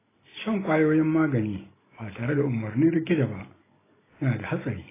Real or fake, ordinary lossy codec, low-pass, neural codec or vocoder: real; AAC, 16 kbps; 3.6 kHz; none